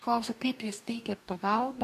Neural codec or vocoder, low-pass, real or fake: codec, 44.1 kHz, 2.6 kbps, DAC; 14.4 kHz; fake